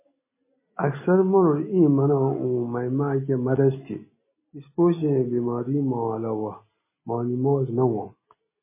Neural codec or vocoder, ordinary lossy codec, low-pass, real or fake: none; MP3, 16 kbps; 3.6 kHz; real